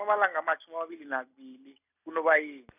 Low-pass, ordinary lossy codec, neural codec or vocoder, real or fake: 3.6 kHz; none; none; real